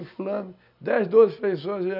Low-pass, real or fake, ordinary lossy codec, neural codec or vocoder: 5.4 kHz; real; none; none